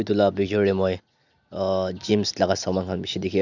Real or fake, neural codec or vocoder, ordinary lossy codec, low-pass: real; none; none; 7.2 kHz